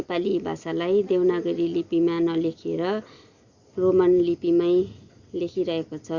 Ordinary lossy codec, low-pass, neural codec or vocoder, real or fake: Opus, 64 kbps; 7.2 kHz; none; real